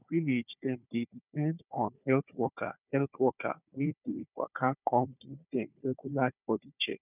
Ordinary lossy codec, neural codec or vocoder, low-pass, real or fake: none; codec, 16 kHz, 4 kbps, FunCodec, trained on Chinese and English, 50 frames a second; 3.6 kHz; fake